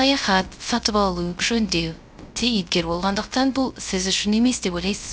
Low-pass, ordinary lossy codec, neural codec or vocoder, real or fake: none; none; codec, 16 kHz, 0.3 kbps, FocalCodec; fake